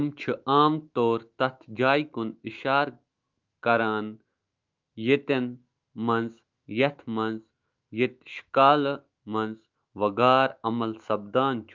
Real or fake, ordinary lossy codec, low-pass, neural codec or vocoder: fake; Opus, 32 kbps; 7.2 kHz; autoencoder, 48 kHz, 128 numbers a frame, DAC-VAE, trained on Japanese speech